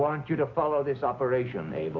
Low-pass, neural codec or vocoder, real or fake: 7.2 kHz; none; real